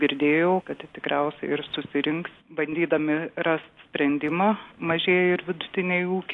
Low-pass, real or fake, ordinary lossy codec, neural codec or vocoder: 10.8 kHz; real; AAC, 48 kbps; none